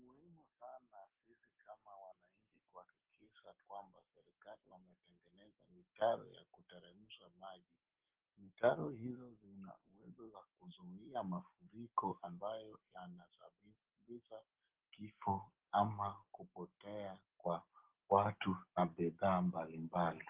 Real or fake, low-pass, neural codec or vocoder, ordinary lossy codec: real; 3.6 kHz; none; Opus, 16 kbps